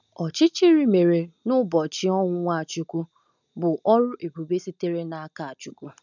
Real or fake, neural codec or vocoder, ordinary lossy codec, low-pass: real; none; none; 7.2 kHz